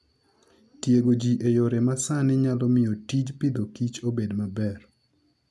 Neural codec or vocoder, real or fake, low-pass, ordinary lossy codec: none; real; none; none